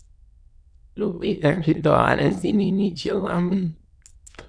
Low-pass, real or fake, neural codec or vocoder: 9.9 kHz; fake; autoencoder, 22.05 kHz, a latent of 192 numbers a frame, VITS, trained on many speakers